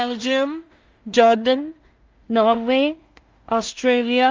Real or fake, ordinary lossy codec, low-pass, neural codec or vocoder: fake; Opus, 32 kbps; 7.2 kHz; codec, 16 kHz in and 24 kHz out, 0.4 kbps, LongCat-Audio-Codec, two codebook decoder